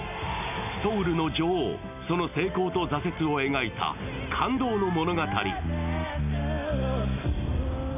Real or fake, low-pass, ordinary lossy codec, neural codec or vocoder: real; 3.6 kHz; none; none